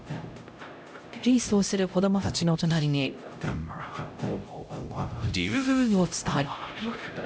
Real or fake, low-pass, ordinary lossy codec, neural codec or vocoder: fake; none; none; codec, 16 kHz, 0.5 kbps, X-Codec, HuBERT features, trained on LibriSpeech